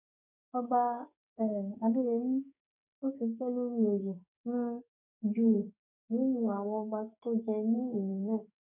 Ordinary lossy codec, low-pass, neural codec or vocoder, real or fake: none; 3.6 kHz; codec, 44.1 kHz, 2.6 kbps, SNAC; fake